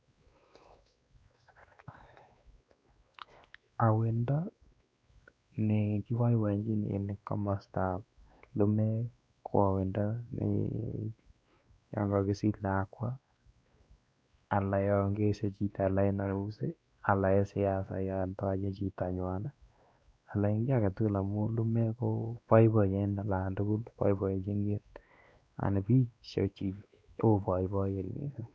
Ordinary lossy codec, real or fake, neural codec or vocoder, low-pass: none; fake; codec, 16 kHz, 2 kbps, X-Codec, WavLM features, trained on Multilingual LibriSpeech; none